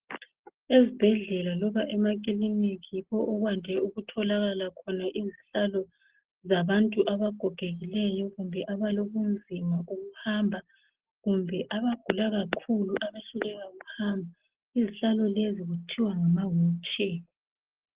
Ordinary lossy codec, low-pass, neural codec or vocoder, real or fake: Opus, 16 kbps; 3.6 kHz; none; real